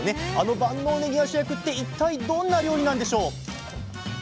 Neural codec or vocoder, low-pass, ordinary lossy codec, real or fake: none; none; none; real